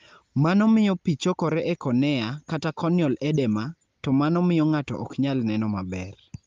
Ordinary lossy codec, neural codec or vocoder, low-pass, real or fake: Opus, 32 kbps; none; 7.2 kHz; real